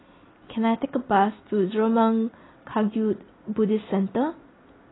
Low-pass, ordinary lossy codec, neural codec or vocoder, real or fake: 7.2 kHz; AAC, 16 kbps; none; real